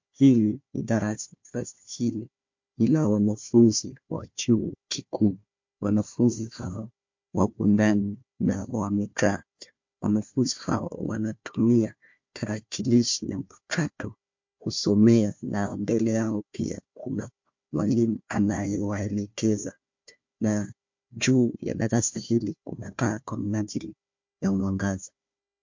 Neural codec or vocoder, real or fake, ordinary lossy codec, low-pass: codec, 16 kHz, 1 kbps, FunCodec, trained on Chinese and English, 50 frames a second; fake; MP3, 48 kbps; 7.2 kHz